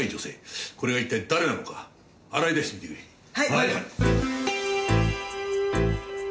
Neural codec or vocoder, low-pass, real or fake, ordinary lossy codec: none; none; real; none